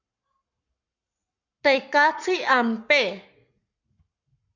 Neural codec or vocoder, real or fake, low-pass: codec, 44.1 kHz, 7.8 kbps, Pupu-Codec; fake; 7.2 kHz